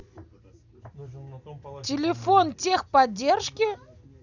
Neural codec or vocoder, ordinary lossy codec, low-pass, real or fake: none; none; 7.2 kHz; real